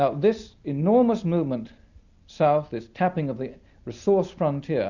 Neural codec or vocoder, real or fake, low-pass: none; real; 7.2 kHz